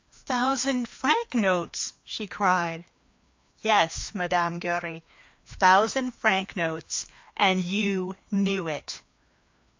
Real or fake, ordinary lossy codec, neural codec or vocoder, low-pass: fake; MP3, 48 kbps; codec, 16 kHz, 2 kbps, FreqCodec, larger model; 7.2 kHz